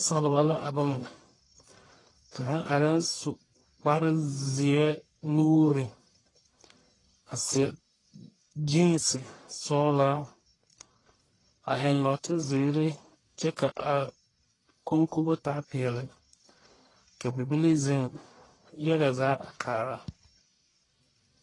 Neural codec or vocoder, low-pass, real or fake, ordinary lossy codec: codec, 44.1 kHz, 1.7 kbps, Pupu-Codec; 10.8 kHz; fake; AAC, 32 kbps